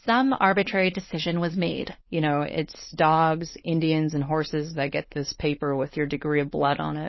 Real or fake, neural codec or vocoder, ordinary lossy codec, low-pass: fake; codec, 16 kHz, 4.8 kbps, FACodec; MP3, 24 kbps; 7.2 kHz